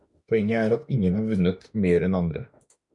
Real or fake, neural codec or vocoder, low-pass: fake; autoencoder, 48 kHz, 32 numbers a frame, DAC-VAE, trained on Japanese speech; 10.8 kHz